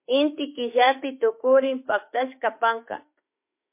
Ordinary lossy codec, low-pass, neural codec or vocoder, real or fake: MP3, 24 kbps; 3.6 kHz; autoencoder, 48 kHz, 32 numbers a frame, DAC-VAE, trained on Japanese speech; fake